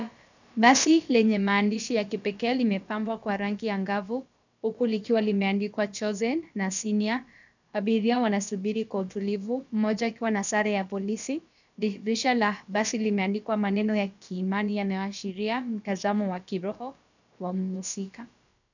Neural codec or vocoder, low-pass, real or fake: codec, 16 kHz, about 1 kbps, DyCAST, with the encoder's durations; 7.2 kHz; fake